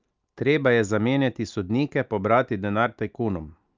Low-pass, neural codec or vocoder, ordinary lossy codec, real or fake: 7.2 kHz; none; Opus, 24 kbps; real